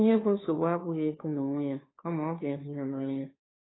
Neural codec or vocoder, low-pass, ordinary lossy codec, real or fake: codec, 16 kHz, 2 kbps, FunCodec, trained on Chinese and English, 25 frames a second; 7.2 kHz; AAC, 16 kbps; fake